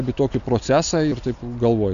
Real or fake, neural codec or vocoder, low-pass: real; none; 7.2 kHz